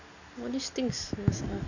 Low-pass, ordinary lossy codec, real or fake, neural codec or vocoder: 7.2 kHz; none; real; none